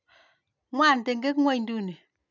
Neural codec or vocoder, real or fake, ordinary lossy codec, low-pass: none; real; none; 7.2 kHz